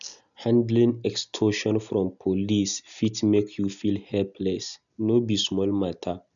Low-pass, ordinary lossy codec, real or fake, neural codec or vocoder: 7.2 kHz; none; real; none